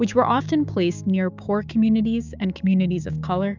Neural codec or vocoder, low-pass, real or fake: codec, 16 kHz, 6 kbps, DAC; 7.2 kHz; fake